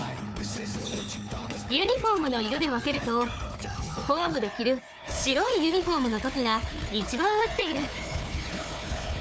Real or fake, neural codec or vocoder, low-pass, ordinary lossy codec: fake; codec, 16 kHz, 4 kbps, FunCodec, trained on Chinese and English, 50 frames a second; none; none